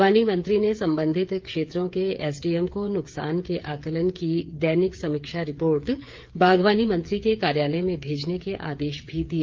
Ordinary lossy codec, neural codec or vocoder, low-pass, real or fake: Opus, 32 kbps; codec, 16 kHz, 8 kbps, FreqCodec, smaller model; 7.2 kHz; fake